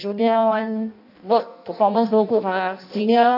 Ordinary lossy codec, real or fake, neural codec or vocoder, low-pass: none; fake; codec, 16 kHz in and 24 kHz out, 0.6 kbps, FireRedTTS-2 codec; 5.4 kHz